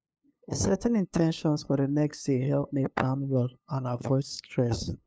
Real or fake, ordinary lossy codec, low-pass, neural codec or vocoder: fake; none; none; codec, 16 kHz, 2 kbps, FunCodec, trained on LibriTTS, 25 frames a second